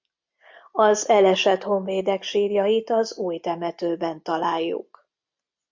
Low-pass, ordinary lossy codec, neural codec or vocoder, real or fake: 7.2 kHz; MP3, 48 kbps; none; real